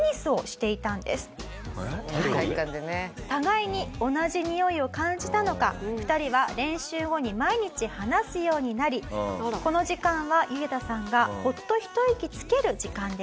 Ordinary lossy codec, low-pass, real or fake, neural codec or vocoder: none; none; real; none